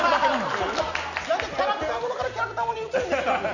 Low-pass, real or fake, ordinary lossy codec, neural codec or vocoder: 7.2 kHz; real; none; none